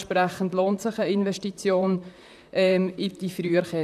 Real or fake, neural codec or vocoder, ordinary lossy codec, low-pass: fake; vocoder, 44.1 kHz, 128 mel bands, Pupu-Vocoder; none; 14.4 kHz